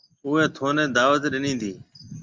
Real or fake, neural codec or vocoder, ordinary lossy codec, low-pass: real; none; Opus, 32 kbps; 7.2 kHz